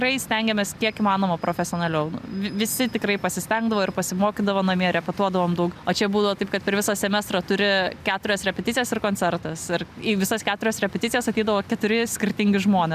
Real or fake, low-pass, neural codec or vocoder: real; 14.4 kHz; none